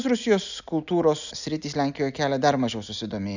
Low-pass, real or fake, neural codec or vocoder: 7.2 kHz; real; none